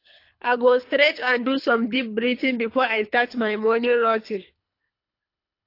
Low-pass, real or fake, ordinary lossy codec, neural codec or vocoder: 5.4 kHz; fake; AAC, 32 kbps; codec, 24 kHz, 3 kbps, HILCodec